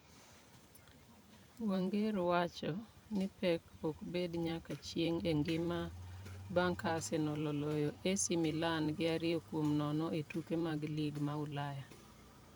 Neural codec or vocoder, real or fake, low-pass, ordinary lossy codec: vocoder, 44.1 kHz, 128 mel bands every 512 samples, BigVGAN v2; fake; none; none